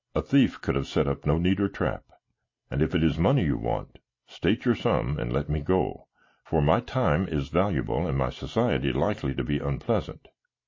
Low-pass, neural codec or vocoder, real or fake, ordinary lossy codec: 7.2 kHz; none; real; MP3, 32 kbps